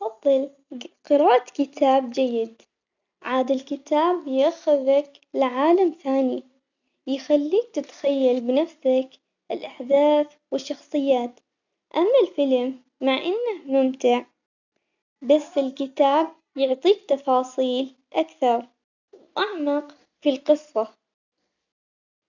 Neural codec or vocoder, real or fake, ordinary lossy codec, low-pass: none; real; none; 7.2 kHz